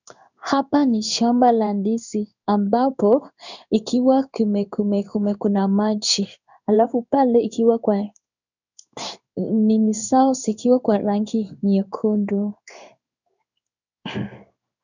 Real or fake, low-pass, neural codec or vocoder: fake; 7.2 kHz; codec, 16 kHz in and 24 kHz out, 1 kbps, XY-Tokenizer